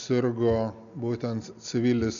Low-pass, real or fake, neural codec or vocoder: 7.2 kHz; real; none